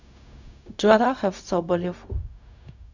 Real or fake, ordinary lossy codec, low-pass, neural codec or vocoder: fake; none; 7.2 kHz; codec, 16 kHz, 0.4 kbps, LongCat-Audio-Codec